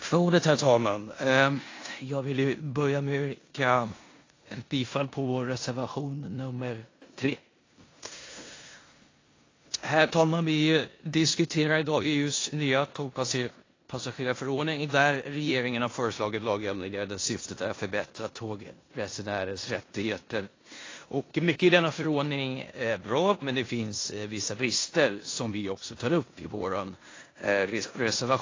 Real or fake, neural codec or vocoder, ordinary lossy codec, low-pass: fake; codec, 16 kHz in and 24 kHz out, 0.9 kbps, LongCat-Audio-Codec, four codebook decoder; AAC, 32 kbps; 7.2 kHz